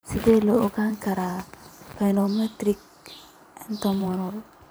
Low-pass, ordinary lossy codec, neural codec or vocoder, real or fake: none; none; vocoder, 44.1 kHz, 128 mel bands every 512 samples, BigVGAN v2; fake